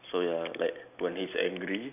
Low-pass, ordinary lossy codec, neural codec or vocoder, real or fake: 3.6 kHz; none; none; real